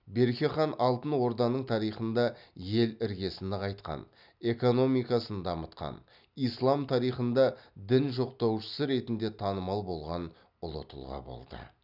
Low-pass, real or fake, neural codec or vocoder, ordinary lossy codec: 5.4 kHz; real; none; none